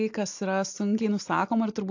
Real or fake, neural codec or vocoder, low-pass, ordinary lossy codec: real; none; 7.2 kHz; AAC, 48 kbps